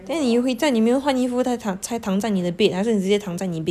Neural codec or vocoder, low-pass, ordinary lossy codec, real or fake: none; 14.4 kHz; none; real